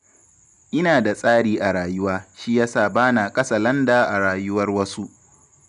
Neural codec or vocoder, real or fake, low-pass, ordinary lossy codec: none; real; 10.8 kHz; none